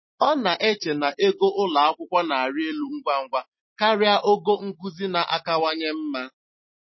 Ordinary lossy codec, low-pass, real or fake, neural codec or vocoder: MP3, 24 kbps; 7.2 kHz; real; none